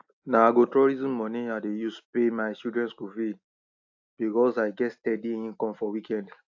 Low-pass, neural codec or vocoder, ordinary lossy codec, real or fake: 7.2 kHz; none; AAC, 48 kbps; real